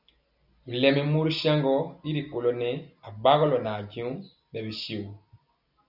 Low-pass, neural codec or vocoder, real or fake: 5.4 kHz; none; real